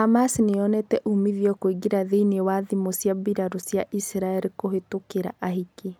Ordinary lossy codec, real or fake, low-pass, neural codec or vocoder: none; real; none; none